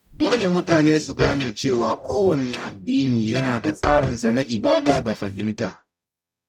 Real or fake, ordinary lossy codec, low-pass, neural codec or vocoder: fake; none; 19.8 kHz; codec, 44.1 kHz, 0.9 kbps, DAC